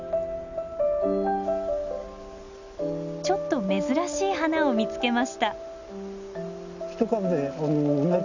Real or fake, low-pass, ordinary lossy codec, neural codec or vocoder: real; 7.2 kHz; none; none